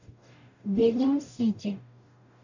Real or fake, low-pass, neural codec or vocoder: fake; 7.2 kHz; codec, 44.1 kHz, 0.9 kbps, DAC